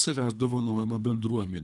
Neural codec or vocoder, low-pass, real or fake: codec, 24 kHz, 3 kbps, HILCodec; 10.8 kHz; fake